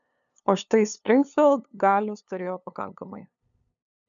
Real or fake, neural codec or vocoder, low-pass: fake; codec, 16 kHz, 2 kbps, FunCodec, trained on LibriTTS, 25 frames a second; 7.2 kHz